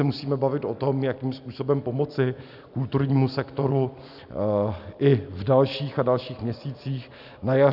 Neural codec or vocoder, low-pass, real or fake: none; 5.4 kHz; real